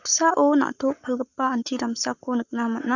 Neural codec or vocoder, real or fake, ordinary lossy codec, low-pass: codec, 44.1 kHz, 7.8 kbps, Pupu-Codec; fake; none; 7.2 kHz